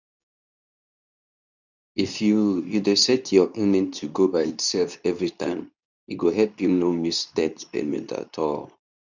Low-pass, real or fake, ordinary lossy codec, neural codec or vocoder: 7.2 kHz; fake; none; codec, 24 kHz, 0.9 kbps, WavTokenizer, medium speech release version 2